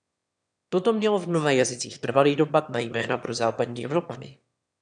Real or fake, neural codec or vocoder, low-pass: fake; autoencoder, 22.05 kHz, a latent of 192 numbers a frame, VITS, trained on one speaker; 9.9 kHz